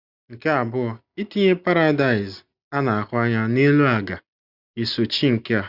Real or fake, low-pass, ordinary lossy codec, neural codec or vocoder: real; 5.4 kHz; Opus, 64 kbps; none